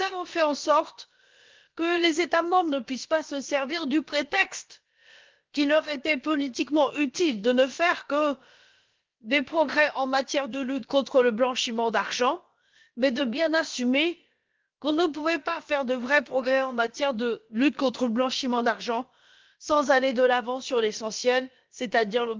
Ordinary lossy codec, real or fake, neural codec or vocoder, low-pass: Opus, 32 kbps; fake; codec, 16 kHz, about 1 kbps, DyCAST, with the encoder's durations; 7.2 kHz